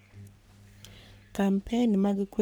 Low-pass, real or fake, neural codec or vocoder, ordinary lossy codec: none; fake; codec, 44.1 kHz, 3.4 kbps, Pupu-Codec; none